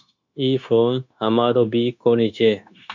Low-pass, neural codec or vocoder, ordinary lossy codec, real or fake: 7.2 kHz; codec, 16 kHz, 0.9 kbps, LongCat-Audio-Codec; MP3, 64 kbps; fake